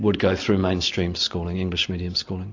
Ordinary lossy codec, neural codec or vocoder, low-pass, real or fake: AAC, 48 kbps; none; 7.2 kHz; real